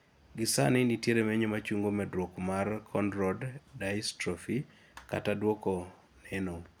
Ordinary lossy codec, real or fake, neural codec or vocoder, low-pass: none; real; none; none